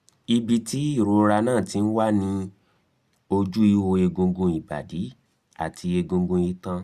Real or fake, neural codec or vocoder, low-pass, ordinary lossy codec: real; none; 14.4 kHz; Opus, 64 kbps